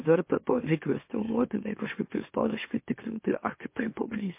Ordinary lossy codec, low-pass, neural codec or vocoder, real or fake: MP3, 24 kbps; 3.6 kHz; autoencoder, 44.1 kHz, a latent of 192 numbers a frame, MeloTTS; fake